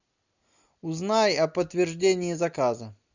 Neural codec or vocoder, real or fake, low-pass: none; real; 7.2 kHz